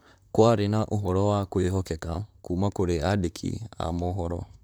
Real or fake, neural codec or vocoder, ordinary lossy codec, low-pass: fake; vocoder, 44.1 kHz, 128 mel bands, Pupu-Vocoder; none; none